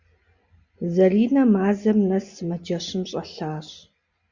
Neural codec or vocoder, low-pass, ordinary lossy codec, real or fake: none; 7.2 kHz; Opus, 64 kbps; real